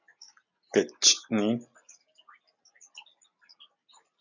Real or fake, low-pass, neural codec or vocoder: real; 7.2 kHz; none